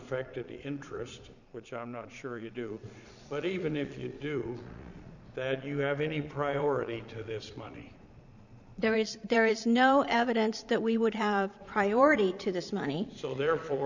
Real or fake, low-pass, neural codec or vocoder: fake; 7.2 kHz; vocoder, 22.05 kHz, 80 mel bands, Vocos